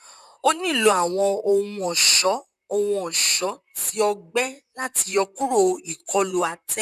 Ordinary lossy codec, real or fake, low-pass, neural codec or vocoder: none; fake; 14.4 kHz; vocoder, 44.1 kHz, 128 mel bands, Pupu-Vocoder